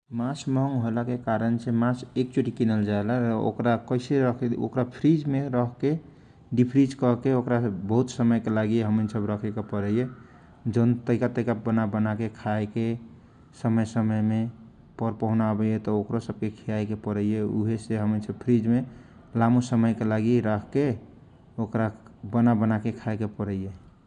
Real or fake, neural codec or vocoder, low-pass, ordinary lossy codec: real; none; 9.9 kHz; none